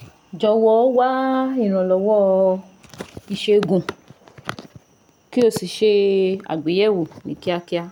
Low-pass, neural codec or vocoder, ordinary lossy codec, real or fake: 19.8 kHz; none; none; real